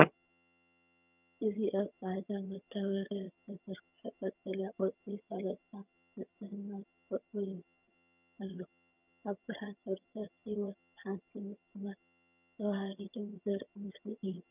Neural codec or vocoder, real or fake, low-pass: vocoder, 22.05 kHz, 80 mel bands, HiFi-GAN; fake; 3.6 kHz